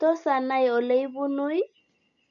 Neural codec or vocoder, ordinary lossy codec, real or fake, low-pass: none; none; real; 7.2 kHz